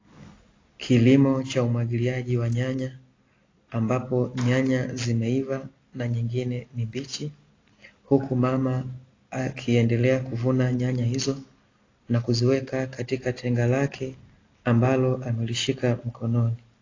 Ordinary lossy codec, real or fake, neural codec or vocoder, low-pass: AAC, 32 kbps; real; none; 7.2 kHz